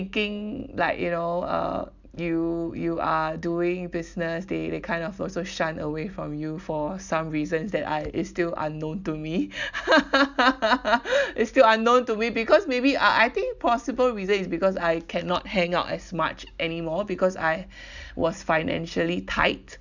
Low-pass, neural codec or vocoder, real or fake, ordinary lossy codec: 7.2 kHz; none; real; none